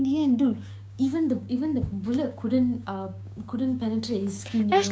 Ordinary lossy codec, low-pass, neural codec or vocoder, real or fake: none; none; codec, 16 kHz, 6 kbps, DAC; fake